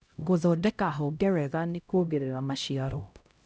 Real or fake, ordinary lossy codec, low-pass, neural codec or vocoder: fake; none; none; codec, 16 kHz, 0.5 kbps, X-Codec, HuBERT features, trained on LibriSpeech